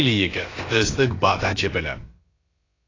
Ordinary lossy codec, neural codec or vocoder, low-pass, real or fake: AAC, 32 kbps; codec, 16 kHz, about 1 kbps, DyCAST, with the encoder's durations; 7.2 kHz; fake